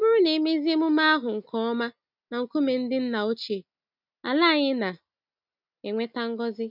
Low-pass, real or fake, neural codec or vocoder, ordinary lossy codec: 5.4 kHz; real; none; none